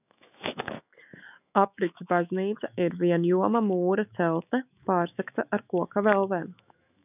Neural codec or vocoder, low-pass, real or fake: autoencoder, 48 kHz, 128 numbers a frame, DAC-VAE, trained on Japanese speech; 3.6 kHz; fake